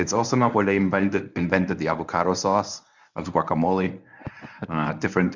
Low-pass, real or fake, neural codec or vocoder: 7.2 kHz; fake; codec, 24 kHz, 0.9 kbps, WavTokenizer, medium speech release version 1